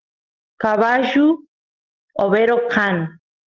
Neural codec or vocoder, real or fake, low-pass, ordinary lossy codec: none; real; 7.2 kHz; Opus, 16 kbps